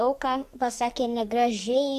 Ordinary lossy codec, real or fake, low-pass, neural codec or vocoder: Opus, 64 kbps; fake; 14.4 kHz; codec, 44.1 kHz, 2.6 kbps, SNAC